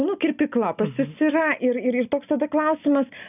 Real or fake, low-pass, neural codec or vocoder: real; 3.6 kHz; none